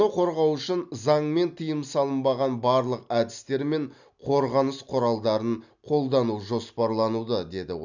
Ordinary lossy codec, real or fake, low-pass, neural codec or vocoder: none; real; 7.2 kHz; none